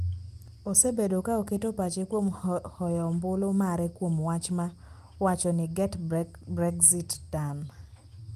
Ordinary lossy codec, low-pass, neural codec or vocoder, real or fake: Opus, 32 kbps; 14.4 kHz; none; real